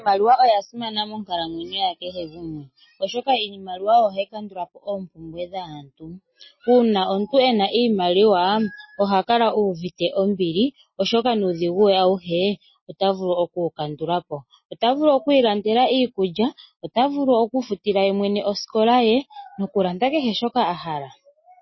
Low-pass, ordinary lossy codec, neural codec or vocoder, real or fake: 7.2 kHz; MP3, 24 kbps; none; real